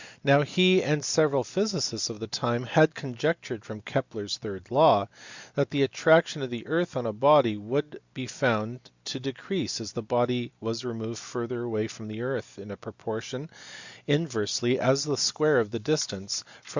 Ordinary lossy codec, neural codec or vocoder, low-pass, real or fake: Opus, 64 kbps; none; 7.2 kHz; real